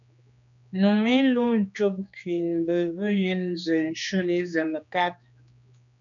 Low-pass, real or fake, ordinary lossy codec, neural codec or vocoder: 7.2 kHz; fake; MP3, 96 kbps; codec, 16 kHz, 2 kbps, X-Codec, HuBERT features, trained on general audio